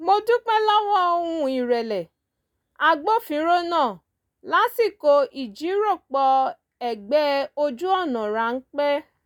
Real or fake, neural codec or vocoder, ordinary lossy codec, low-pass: real; none; none; 19.8 kHz